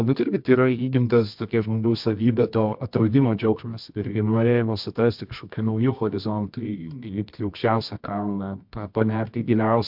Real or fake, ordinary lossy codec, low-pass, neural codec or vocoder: fake; MP3, 48 kbps; 5.4 kHz; codec, 24 kHz, 0.9 kbps, WavTokenizer, medium music audio release